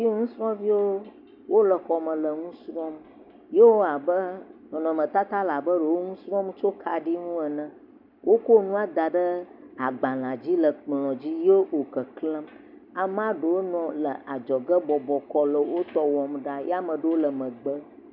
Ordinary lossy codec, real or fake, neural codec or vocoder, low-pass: MP3, 48 kbps; real; none; 5.4 kHz